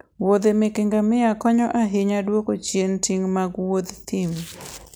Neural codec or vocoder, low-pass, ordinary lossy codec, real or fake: none; none; none; real